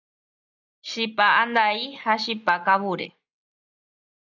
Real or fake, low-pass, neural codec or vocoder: real; 7.2 kHz; none